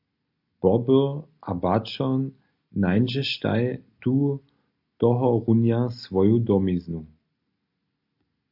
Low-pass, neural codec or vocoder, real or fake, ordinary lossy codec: 5.4 kHz; none; real; AAC, 48 kbps